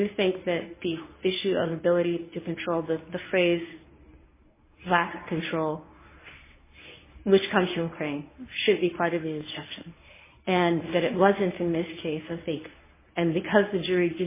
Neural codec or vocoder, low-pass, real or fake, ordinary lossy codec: codec, 24 kHz, 0.9 kbps, WavTokenizer, medium speech release version 1; 3.6 kHz; fake; MP3, 16 kbps